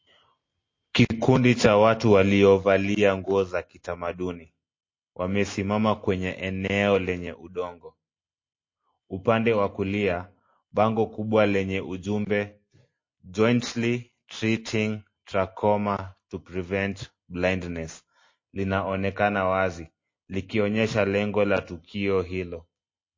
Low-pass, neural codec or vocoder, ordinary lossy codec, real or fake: 7.2 kHz; none; MP3, 32 kbps; real